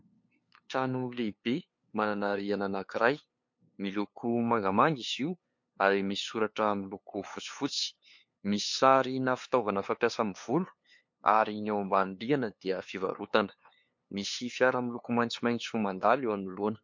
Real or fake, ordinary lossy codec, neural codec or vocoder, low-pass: fake; MP3, 48 kbps; codec, 16 kHz, 2 kbps, FunCodec, trained on LibriTTS, 25 frames a second; 7.2 kHz